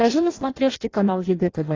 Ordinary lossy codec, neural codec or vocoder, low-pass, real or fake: AAC, 32 kbps; codec, 16 kHz in and 24 kHz out, 0.6 kbps, FireRedTTS-2 codec; 7.2 kHz; fake